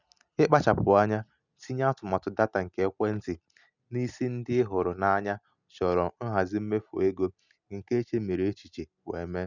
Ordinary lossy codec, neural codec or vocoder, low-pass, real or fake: none; none; 7.2 kHz; real